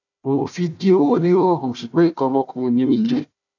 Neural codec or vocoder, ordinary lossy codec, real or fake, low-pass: codec, 16 kHz, 1 kbps, FunCodec, trained on Chinese and English, 50 frames a second; none; fake; 7.2 kHz